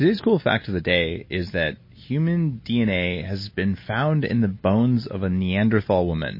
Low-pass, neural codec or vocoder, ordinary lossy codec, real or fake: 5.4 kHz; none; MP3, 24 kbps; real